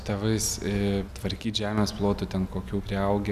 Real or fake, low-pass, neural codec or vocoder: real; 14.4 kHz; none